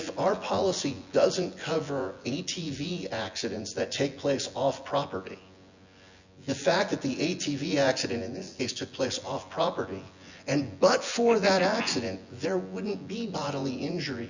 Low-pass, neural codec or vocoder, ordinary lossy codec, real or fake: 7.2 kHz; vocoder, 24 kHz, 100 mel bands, Vocos; Opus, 64 kbps; fake